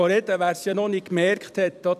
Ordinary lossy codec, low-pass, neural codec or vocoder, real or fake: none; 14.4 kHz; vocoder, 44.1 kHz, 128 mel bands every 256 samples, BigVGAN v2; fake